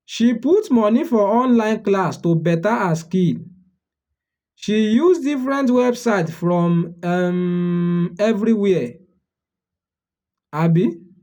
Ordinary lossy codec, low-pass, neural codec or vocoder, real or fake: none; 19.8 kHz; none; real